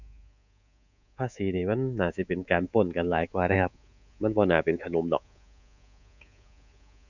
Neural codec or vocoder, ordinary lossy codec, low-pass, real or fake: codec, 24 kHz, 3.1 kbps, DualCodec; none; 7.2 kHz; fake